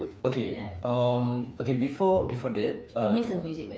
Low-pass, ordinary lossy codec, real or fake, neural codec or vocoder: none; none; fake; codec, 16 kHz, 2 kbps, FreqCodec, larger model